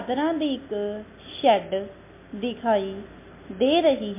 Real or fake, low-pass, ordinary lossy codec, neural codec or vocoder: real; 3.6 kHz; MP3, 24 kbps; none